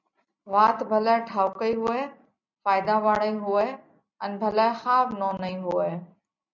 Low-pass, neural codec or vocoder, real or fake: 7.2 kHz; none; real